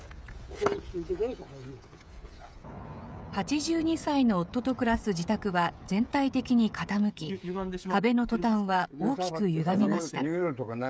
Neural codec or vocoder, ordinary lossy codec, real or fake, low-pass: codec, 16 kHz, 16 kbps, FreqCodec, smaller model; none; fake; none